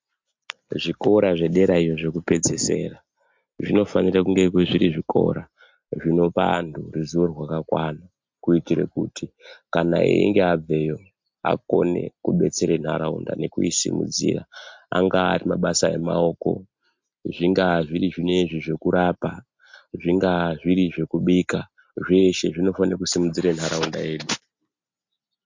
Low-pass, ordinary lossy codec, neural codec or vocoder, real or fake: 7.2 kHz; MP3, 64 kbps; none; real